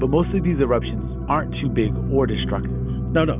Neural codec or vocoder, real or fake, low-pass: none; real; 3.6 kHz